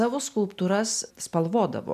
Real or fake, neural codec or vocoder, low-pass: real; none; 14.4 kHz